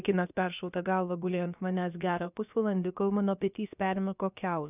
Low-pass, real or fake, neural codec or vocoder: 3.6 kHz; fake; codec, 16 kHz, about 1 kbps, DyCAST, with the encoder's durations